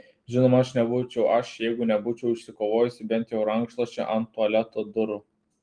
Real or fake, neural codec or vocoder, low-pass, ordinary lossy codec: real; none; 9.9 kHz; Opus, 24 kbps